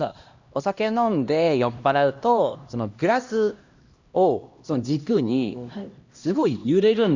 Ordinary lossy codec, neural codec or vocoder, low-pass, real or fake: Opus, 64 kbps; codec, 16 kHz, 1 kbps, X-Codec, HuBERT features, trained on LibriSpeech; 7.2 kHz; fake